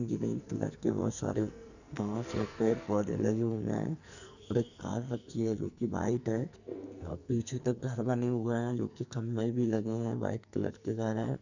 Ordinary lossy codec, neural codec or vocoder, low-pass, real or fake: none; codec, 44.1 kHz, 2.6 kbps, SNAC; 7.2 kHz; fake